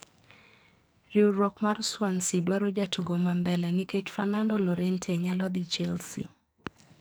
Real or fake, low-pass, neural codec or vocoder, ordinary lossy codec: fake; none; codec, 44.1 kHz, 2.6 kbps, SNAC; none